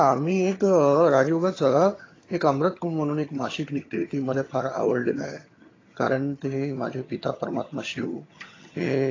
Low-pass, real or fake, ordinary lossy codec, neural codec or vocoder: 7.2 kHz; fake; AAC, 32 kbps; vocoder, 22.05 kHz, 80 mel bands, HiFi-GAN